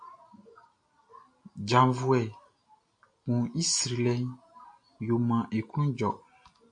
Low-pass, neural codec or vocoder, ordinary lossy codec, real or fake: 9.9 kHz; none; AAC, 64 kbps; real